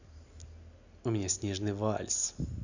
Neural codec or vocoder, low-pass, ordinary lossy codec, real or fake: none; 7.2 kHz; Opus, 64 kbps; real